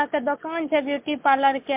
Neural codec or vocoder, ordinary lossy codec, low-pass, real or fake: none; MP3, 32 kbps; 3.6 kHz; real